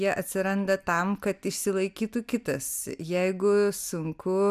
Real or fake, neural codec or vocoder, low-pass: real; none; 14.4 kHz